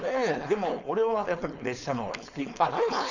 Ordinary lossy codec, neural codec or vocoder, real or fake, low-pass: none; codec, 16 kHz, 4.8 kbps, FACodec; fake; 7.2 kHz